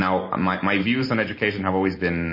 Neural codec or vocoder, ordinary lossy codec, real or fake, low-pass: none; MP3, 24 kbps; real; 5.4 kHz